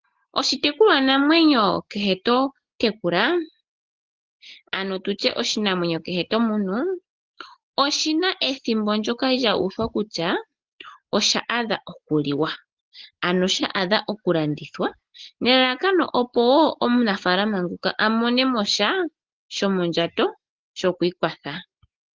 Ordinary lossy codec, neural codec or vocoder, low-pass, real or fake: Opus, 16 kbps; none; 7.2 kHz; real